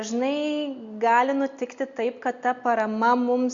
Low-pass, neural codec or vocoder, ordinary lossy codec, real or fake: 7.2 kHz; none; Opus, 64 kbps; real